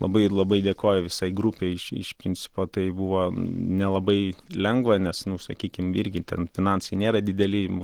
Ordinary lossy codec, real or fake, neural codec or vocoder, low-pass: Opus, 16 kbps; real; none; 19.8 kHz